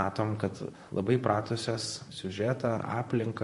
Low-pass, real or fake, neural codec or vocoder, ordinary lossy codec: 14.4 kHz; fake; vocoder, 44.1 kHz, 128 mel bands, Pupu-Vocoder; MP3, 48 kbps